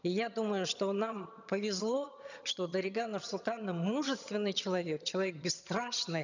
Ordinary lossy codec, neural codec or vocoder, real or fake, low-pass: none; vocoder, 22.05 kHz, 80 mel bands, HiFi-GAN; fake; 7.2 kHz